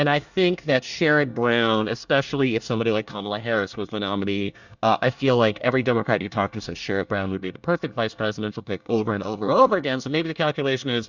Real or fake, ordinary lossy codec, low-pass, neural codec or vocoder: fake; Opus, 64 kbps; 7.2 kHz; codec, 24 kHz, 1 kbps, SNAC